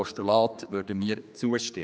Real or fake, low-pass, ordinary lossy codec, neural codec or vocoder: fake; none; none; codec, 16 kHz, 2 kbps, X-Codec, HuBERT features, trained on general audio